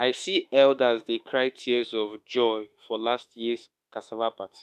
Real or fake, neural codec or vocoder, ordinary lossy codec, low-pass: fake; autoencoder, 48 kHz, 32 numbers a frame, DAC-VAE, trained on Japanese speech; none; 14.4 kHz